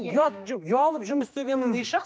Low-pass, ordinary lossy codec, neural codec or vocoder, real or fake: none; none; codec, 16 kHz, 2 kbps, X-Codec, HuBERT features, trained on general audio; fake